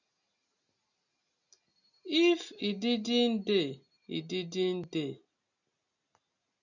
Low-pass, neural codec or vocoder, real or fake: 7.2 kHz; none; real